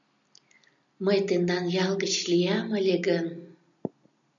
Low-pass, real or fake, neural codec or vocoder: 7.2 kHz; real; none